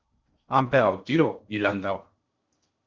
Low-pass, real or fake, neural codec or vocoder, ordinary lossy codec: 7.2 kHz; fake; codec, 16 kHz in and 24 kHz out, 0.6 kbps, FocalCodec, streaming, 2048 codes; Opus, 32 kbps